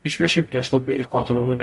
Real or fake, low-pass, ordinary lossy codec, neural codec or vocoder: fake; 14.4 kHz; MP3, 48 kbps; codec, 44.1 kHz, 0.9 kbps, DAC